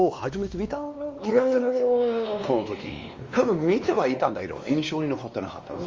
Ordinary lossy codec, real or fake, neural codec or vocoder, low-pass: Opus, 32 kbps; fake; codec, 16 kHz, 2 kbps, X-Codec, WavLM features, trained on Multilingual LibriSpeech; 7.2 kHz